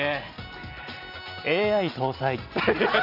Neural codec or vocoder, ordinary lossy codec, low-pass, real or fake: none; none; 5.4 kHz; real